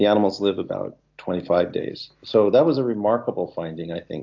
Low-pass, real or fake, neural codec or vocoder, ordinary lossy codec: 7.2 kHz; real; none; AAC, 48 kbps